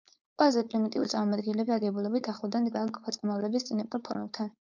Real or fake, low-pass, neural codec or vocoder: fake; 7.2 kHz; codec, 16 kHz, 4.8 kbps, FACodec